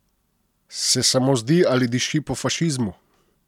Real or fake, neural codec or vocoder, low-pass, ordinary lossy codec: real; none; 19.8 kHz; none